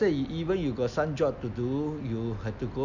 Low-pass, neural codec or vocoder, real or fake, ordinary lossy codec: 7.2 kHz; none; real; none